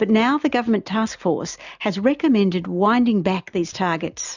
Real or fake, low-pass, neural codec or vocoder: real; 7.2 kHz; none